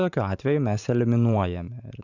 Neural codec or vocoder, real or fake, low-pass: vocoder, 44.1 kHz, 128 mel bands every 512 samples, BigVGAN v2; fake; 7.2 kHz